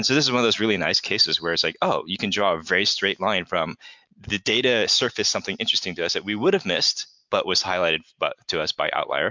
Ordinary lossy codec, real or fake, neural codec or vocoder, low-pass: MP3, 64 kbps; real; none; 7.2 kHz